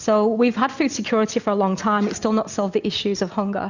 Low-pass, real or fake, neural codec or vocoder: 7.2 kHz; real; none